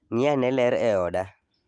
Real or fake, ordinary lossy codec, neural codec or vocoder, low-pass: real; Opus, 24 kbps; none; 9.9 kHz